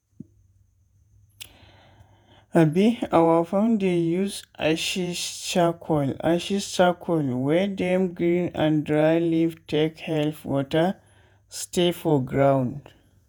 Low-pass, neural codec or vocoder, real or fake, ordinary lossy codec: none; vocoder, 48 kHz, 128 mel bands, Vocos; fake; none